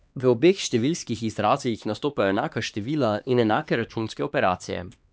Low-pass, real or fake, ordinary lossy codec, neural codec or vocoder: none; fake; none; codec, 16 kHz, 2 kbps, X-Codec, HuBERT features, trained on LibriSpeech